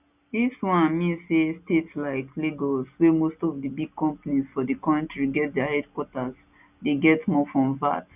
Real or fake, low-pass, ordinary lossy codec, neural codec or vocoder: real; 3.6 kHz; none; none